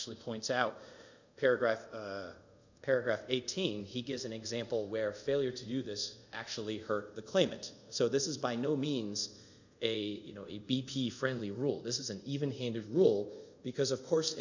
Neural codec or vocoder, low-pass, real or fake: codec, 24 kHz, 0.5 kbps, DualCodec; 7.2 kHz; fake